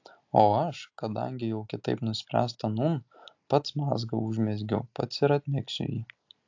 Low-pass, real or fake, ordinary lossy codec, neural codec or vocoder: 7.2 kHz; real; AAC, 48 kbps; none